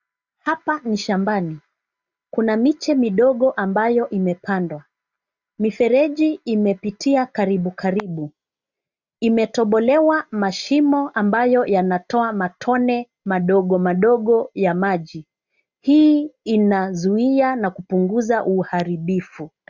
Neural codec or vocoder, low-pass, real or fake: none; 7.2 kHz; real